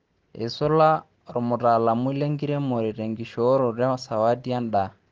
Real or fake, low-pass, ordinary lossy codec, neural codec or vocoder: real; 7.2 kHz; Opus, 16 kbps; none